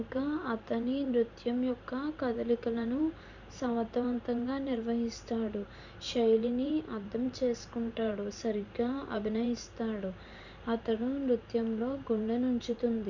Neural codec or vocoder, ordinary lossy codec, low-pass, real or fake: vocoder, 44.1 kHz, 128 mel bands every 512 samples, BigVGAN v2; none; 7.2 kHz; fake